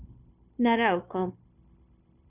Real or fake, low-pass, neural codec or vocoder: fake; 3.6 kHz; codec, 16 kHz, 0.9 kbps, LongCat-Audio-Codec